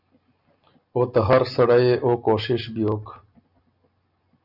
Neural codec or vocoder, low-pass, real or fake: none; 5.4 kHz; real